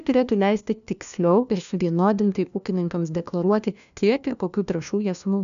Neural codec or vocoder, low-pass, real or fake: codec, 16 kHz, 1 kbps, FunCodec, trained on LibriTTS, 50 frames a second; 7.2 kHz; fake